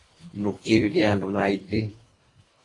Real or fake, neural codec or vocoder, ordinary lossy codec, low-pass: fake; codec, 24 kHz, 1.5 kbps, HILCodec; AAC, 32 kbps; 10.8 kHz